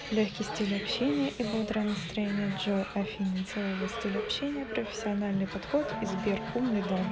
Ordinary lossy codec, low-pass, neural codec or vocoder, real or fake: none; none; none; real